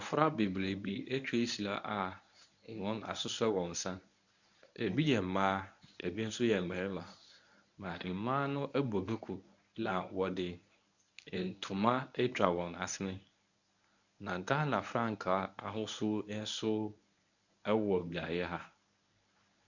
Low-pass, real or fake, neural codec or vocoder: 7.2 kHz; fake; codec, 24 kHz, 0.9 kbps, WavTokenizer, medium speech release version 1